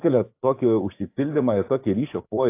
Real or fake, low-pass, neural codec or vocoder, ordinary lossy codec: fake; 3.6 kHz; codec, 44.1 kHz, 7.8 kbps, DAC; AAC, 24 kbps